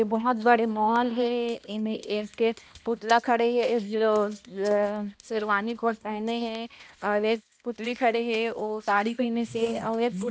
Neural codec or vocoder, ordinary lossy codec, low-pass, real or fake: codec, 16 kHz, 1 kbps, X-Codec, HuBERT features, trained on balanced general audio; none; none; fake